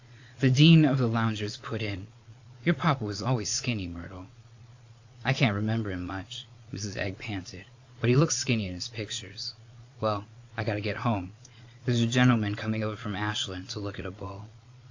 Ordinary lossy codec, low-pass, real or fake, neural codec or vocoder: AAC, 48 kbps; 7.2 kHz; fake; vocoder, 22.05 kHz, 80 mel bands, Vocos